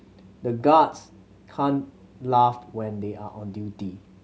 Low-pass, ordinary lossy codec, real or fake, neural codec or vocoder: none; none; real; none